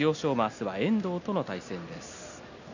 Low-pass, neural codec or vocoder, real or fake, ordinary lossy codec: 7.2 kHz; none; real; none